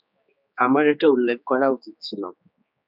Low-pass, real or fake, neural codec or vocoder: 5.4 kHz; fake; codec, 16 kHz, 4 kbps, X-Codec, HuBERT features, trained on general audio